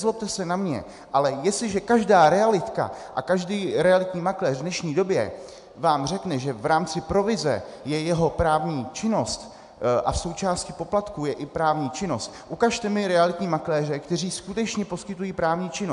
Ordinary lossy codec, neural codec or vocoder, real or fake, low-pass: AAC, 96 kbps; none; real; 10.8 kHz